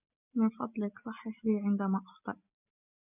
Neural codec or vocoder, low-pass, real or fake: none; 3.6 kHz; real